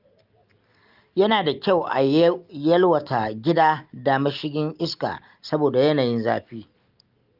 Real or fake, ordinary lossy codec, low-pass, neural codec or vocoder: real; Opus, 32 kbps; 5.4 kHz; none